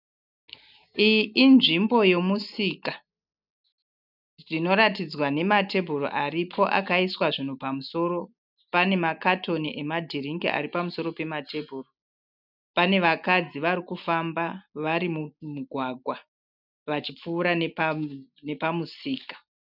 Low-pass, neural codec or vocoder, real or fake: 5.4 kHz; none; real